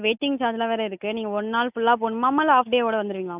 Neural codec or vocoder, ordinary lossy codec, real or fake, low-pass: none; none; real; 3.6 kHz